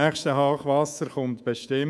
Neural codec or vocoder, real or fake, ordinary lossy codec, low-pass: codec, 24 kHz, 3.1 kbps, DualCodec; fake; none; none